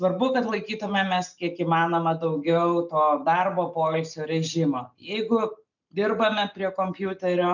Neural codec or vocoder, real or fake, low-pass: none; real; 7.2 kHz